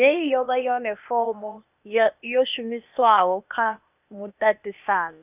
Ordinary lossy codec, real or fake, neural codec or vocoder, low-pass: none; fake; codec, 16 kHz, 0.8 kbps, ZipCodec; 3.6 kHz